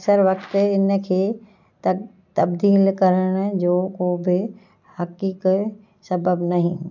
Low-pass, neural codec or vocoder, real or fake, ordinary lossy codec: 7.2 kHz; none; real; none